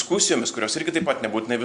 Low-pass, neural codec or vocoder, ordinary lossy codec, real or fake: 9.9 kHz; none; Opus, 64 kbps; real